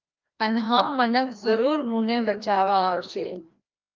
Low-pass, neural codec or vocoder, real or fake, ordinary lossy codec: 7.2 kHz; codec, 16 kHz, 1 kbps, FreqCodec, larger model; fake; Opus, 24 kbps